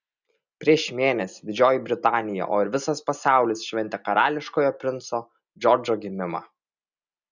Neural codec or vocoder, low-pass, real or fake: none; 7.2 kHz; real